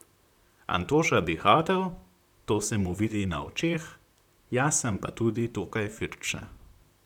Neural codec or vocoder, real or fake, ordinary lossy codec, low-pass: vocoder, 44.1 kHz, 128 mel bands, Pupu-Vocoder; fake; none; 19.8 kHz